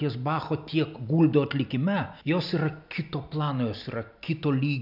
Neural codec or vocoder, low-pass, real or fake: none; 5.4 kHz; real